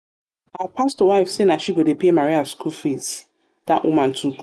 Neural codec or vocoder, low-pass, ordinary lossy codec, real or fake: none; none; none; real